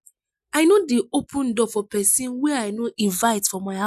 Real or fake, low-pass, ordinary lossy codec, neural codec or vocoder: real; 14.4 kHz; none; none